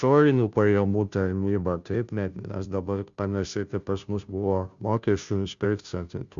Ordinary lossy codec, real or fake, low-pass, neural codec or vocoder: Opus, 64 kbps; fake; 7.2 kHz; codec, 16 kHz, 0.5 kbps, FunCodec, trained on Chinese and English, 25 frames a second